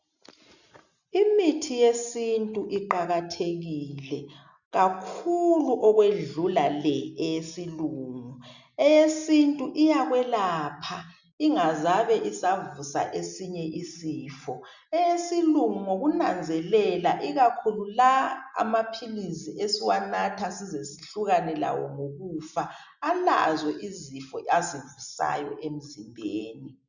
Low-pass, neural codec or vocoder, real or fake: 7.2 kHz; none; real